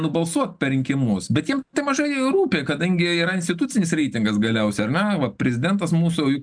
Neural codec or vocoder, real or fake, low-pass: none; real; 9.9 kHz